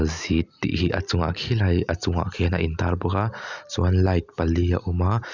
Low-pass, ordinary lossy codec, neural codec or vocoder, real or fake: 7.2 kHz; none; none; real